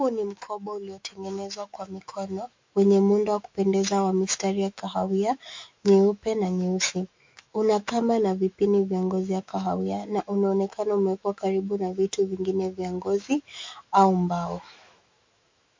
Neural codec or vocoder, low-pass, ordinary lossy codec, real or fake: autoencoder, 48 kHz, 128 numbers a frame, DAC-VAE, trained on Japanese speech; 7.2 kHz; MP3, 48 kbps; fake